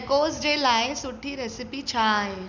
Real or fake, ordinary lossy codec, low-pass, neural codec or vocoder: real; none; 7.2 kHz; none